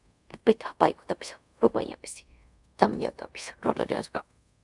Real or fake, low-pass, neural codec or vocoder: fake; 10.8 kHz; codec, 24 kHz, 0.5 kbps, DualCodec